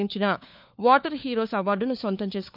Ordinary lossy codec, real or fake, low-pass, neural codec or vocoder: none; fake; 5.4 kHz; codec, 16 kHz, 4 kbps, FunCodec, trained on LibriTTS, 50 frames a second